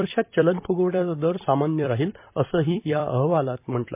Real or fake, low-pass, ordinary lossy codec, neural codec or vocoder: fake; 3.6 kHz; MP3, 32 kbps; vocoder, 44.1 kHz, 128 mel bands every 256 samples, BigVGAN v2